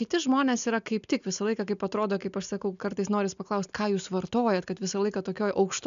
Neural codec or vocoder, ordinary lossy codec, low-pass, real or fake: none; MP3, 96 kbps; 7.2 kHz; real